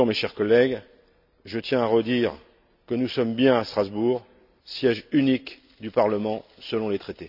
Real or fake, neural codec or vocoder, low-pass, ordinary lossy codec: real; none; 5.4 kHz; none